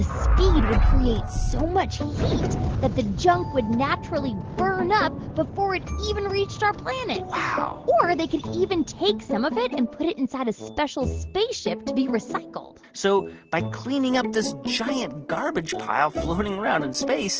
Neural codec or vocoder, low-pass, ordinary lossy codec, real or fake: none; 7.2 kHz; Opus, 16 kbps; real